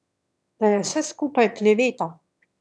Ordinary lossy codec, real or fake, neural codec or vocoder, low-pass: none; fake; autoencoder, 22.05 kHz, a latent of 192 numbers a frame, VITS, trained on one speaker; none